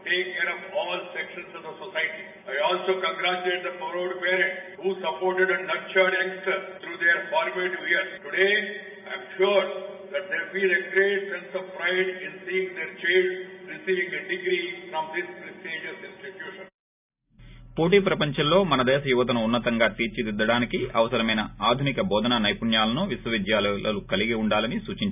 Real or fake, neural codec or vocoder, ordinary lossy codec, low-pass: real; none; none; 3.6 kHz